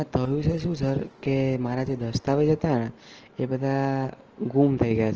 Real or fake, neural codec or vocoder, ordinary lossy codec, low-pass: real; none; Opus, 16 kbps; 7.2 kHz